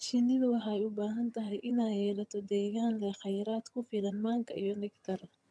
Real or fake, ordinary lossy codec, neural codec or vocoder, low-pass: fake; none; vocoder, 22.05 kHz, 80 mel bands, HiFi-GAN; none